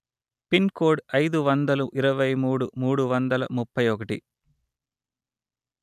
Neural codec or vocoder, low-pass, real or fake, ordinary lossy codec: none; 14.4 kHz; real; none